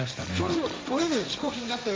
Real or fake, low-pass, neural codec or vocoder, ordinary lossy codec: fake; none; codec, 16 kHz, 1.1 kbps, Voila-Tokenizer; none